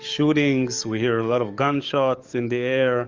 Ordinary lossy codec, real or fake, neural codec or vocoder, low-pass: Opus, 32 kbps; fake; codec, 44.1 kHz, 7.8 kbps, DAC; 7.2 kHz